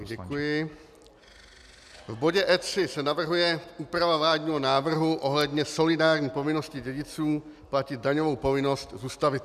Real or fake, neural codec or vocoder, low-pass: real; none; 14.4 kHz